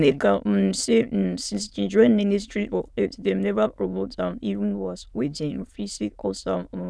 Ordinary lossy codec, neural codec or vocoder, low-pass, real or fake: none; autoencoder, 22.05 kHz, a latent of 192 numbers a frame, VITS, trained on many speakers; none; fake